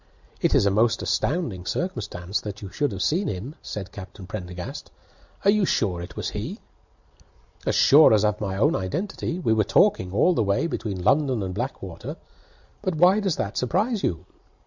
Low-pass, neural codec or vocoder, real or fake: 7.2 kHz; none; real